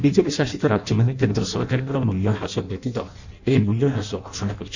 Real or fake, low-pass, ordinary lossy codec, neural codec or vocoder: fake; 7.2 kHz; none; codec, 16 kHz in and 24 kHz out, 0.6 kbps, FireRedTTS-2 codec